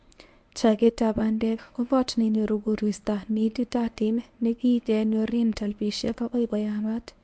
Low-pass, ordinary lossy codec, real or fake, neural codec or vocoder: 9.9 kHz; MP3, 64 kbps; fake; codec, 24 kHz, 0.9 kbps, WavTokenizer, medium speech release version 1